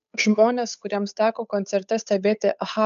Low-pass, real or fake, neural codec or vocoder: 7.2 kHz; fake; codec, 16 kHz, 8 kbps, FunCodec, trained on Chinese and English, 25 frames a second